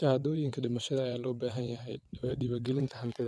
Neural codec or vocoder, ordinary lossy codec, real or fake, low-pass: vocoder, 22.05 kHz, 80 mel bands, WaveNeXt; none; fake; none